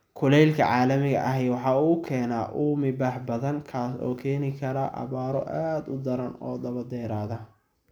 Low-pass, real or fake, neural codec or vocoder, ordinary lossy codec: 19.8 kHz; real; none; none